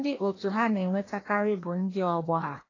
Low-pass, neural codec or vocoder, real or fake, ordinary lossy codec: 7.2 kHz; codec, 16 kHz, 1 kbps, FreqCodec, larger model; fake; AAC, 32 kbps